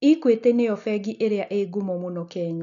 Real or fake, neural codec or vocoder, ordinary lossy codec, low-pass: real; none; none; 7.2 kHz